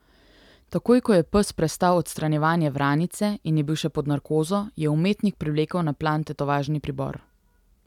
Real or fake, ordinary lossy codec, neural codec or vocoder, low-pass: real; none; none; 19.8 kHz